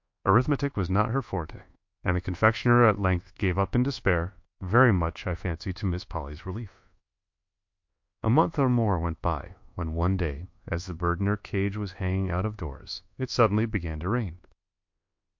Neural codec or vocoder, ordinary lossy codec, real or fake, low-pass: codec, 24 kHz, 1.2 kbps, DualCodec; MP3, 48 kbps; fake; 7.2 kHz